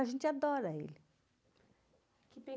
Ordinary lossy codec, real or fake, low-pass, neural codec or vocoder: none; real; none; none